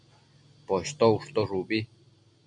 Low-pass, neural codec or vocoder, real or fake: 9.9 kHz; none; real